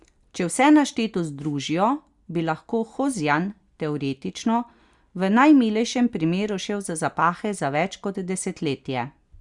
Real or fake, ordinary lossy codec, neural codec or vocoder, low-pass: real; Opus, 64 kbps; none; 10.8 kHz